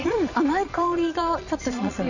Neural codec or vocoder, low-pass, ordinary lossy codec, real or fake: vocoder, 22.05 kHz, 80 mel bands, Vocos; 7.2 kHz; none; fake